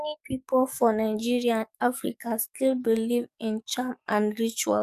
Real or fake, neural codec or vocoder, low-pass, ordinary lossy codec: fake; codec, 44.1 kHz, 7.8 kbps, DAC; 14.4 kHz; none